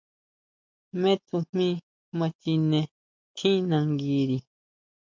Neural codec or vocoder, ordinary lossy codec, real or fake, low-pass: none; MP3, 64 kbps; real; 7.2 kHz